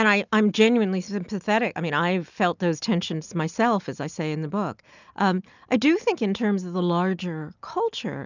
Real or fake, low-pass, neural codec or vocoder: real; 7.2 kHz; none